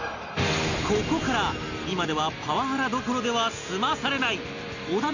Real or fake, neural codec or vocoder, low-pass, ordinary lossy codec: real; none; 7.2 kHz; none